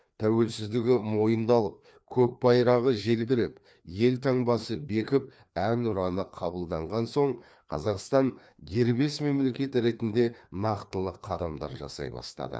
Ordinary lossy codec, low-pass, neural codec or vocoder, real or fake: none; none; codec, 16 kHz, 2 kbps, FreqCodec, larger model; fake